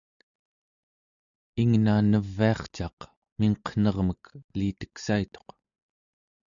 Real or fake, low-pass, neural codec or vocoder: real; 7.2 kHz; none